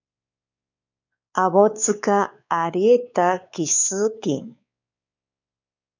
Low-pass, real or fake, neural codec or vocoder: 7.2 kHz; fake; codec, 16 kHz, 4 kbps, X-Codec, WavLM features, trained on Multilingual LibriSpeech